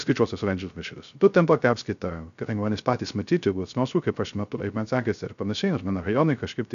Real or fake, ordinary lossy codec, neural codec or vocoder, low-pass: fake; MP3, 96 kbps; codec, 16 kHz, 0.3 kbps, FocalCodec; 7.2 kHz